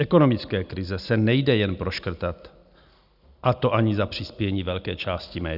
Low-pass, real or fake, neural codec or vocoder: 5.4 kHz; real; none